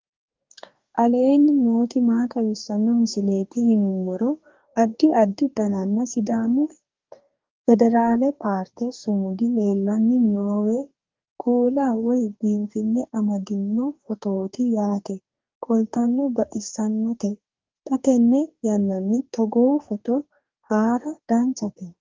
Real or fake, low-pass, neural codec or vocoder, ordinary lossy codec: fake; 7.2 kHz; codec, 44.1 kHz, 2.6 kbps, DAC; Opus, 32 kbps